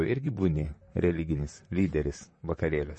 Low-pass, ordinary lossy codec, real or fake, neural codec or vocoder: 10.8 kHz; MP3, 32 kbps; fake; vocoder, 44.1 kHz, 128 mel bands, Pupu-Vocoder